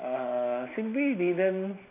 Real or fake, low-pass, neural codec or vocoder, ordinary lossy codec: real; 3.6 kHz; none; MP3, 24 kbps